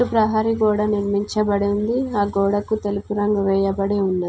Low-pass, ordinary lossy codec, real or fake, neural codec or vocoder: none; none; real; none